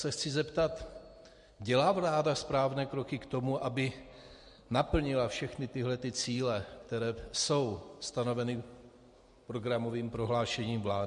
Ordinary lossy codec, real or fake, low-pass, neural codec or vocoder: MP3, 48 kbps; real; 14.4 kHz; none